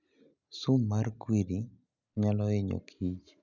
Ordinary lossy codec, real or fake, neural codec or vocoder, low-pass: none; real; none; 7.2 kHz